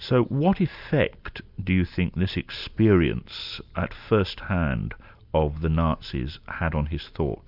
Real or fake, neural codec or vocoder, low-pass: real; none; 5.4 kHz